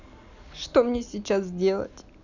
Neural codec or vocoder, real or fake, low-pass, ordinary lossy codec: none; real; 7.2 kHz; none